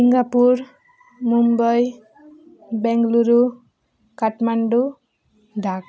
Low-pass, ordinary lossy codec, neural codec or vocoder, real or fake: none; none; none; real